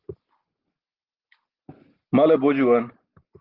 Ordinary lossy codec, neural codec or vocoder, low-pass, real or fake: Opus, 16 kbps; none; 5.4 kHz; real